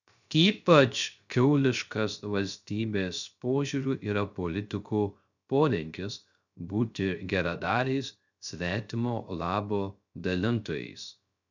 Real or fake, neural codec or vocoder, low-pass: fake; codec, 16 kHz, 0.3 kbps, FocalCodec; 7.2 kHz